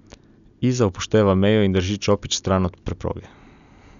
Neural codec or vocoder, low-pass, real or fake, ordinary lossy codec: none; 7.2 kHz; real; none